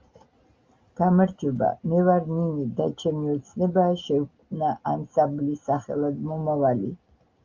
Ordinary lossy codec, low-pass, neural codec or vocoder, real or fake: Opus, 32 kbps; 7.2 kHz; none; real